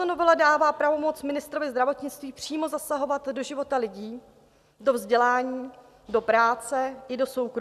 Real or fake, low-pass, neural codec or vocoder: real; 14.4 kHz; none